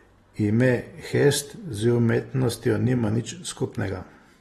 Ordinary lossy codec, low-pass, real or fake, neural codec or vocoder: AAC, 32 kbps; 19.8 kHz; real; none